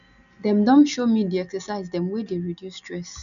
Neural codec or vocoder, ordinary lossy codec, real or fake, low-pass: none; none; real; 7.2 kHz